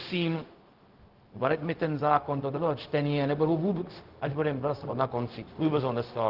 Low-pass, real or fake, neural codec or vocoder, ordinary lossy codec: 5.4 kHz; fake; codec, 16 kHz, 0.4 kbps, LongCat-Audio-Codec; Opus, 16 kbps